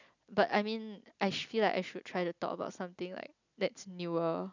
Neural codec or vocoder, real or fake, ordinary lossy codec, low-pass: none; real; none; 7.2 kHz